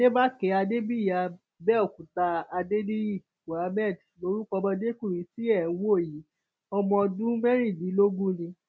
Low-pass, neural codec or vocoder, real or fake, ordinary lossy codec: none; none; real; none